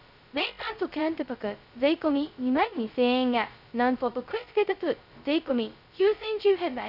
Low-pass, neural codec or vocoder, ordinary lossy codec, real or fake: 5.4 kHz; codec, 16 kHz, 0.2 kbps, FocalCodec; none; fake